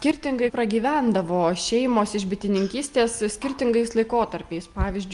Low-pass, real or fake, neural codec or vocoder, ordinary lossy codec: 10.8 kHz; real; none; Opus, 32 kbps